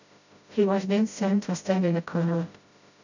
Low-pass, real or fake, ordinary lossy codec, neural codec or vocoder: 7.2 kHz; fake; none; codec, 16 kHz, 0.5 kbps, FreqCodec, smaller model